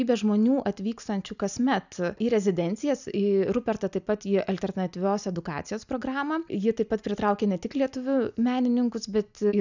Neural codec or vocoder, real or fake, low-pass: none; real; 7.2 kHz